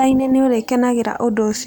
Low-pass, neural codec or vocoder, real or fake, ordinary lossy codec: none; none; real; none